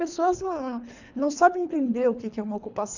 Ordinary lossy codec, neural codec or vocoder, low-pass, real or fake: none; codec, 24 kHz, 3 kbps, HILCodec; 7.2 kHz; fake